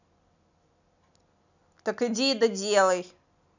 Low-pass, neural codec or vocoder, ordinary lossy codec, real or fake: 7.2 kHz; none; none; real